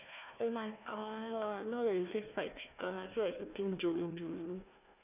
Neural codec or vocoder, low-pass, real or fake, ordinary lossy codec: codec, 16 kHz, 1 kbps, FunCodec, trained on Chinese and English, 50 frames a second; 3.6 kHz; fake; none